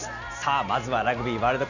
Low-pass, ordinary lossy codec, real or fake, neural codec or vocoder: 7.2 kHz; none; real; none